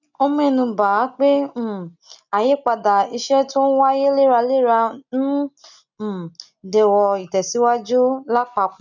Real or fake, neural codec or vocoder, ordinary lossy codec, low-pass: real; none; none; 7.2 kHz